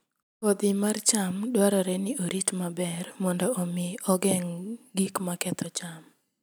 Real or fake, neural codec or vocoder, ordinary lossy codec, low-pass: real; none; none; none